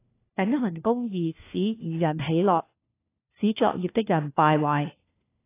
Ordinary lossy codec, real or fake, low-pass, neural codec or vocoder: AAC, 24 kbps; fake; 3.6 kHz; codec, 16 kHz, 1 kbps, FunCodec, trained on LibriTTS, 50 frames a second